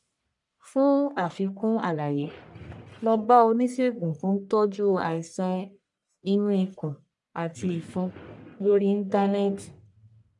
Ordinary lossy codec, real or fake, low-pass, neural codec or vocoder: none; fake; 10.8 kHz; codec, 44.1 kHz, 1.7 kbps, Pupu-Codec